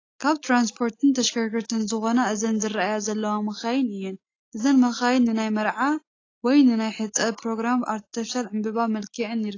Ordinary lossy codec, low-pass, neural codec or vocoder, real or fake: AAC, 32 kbps; 7.2 kHz; none; real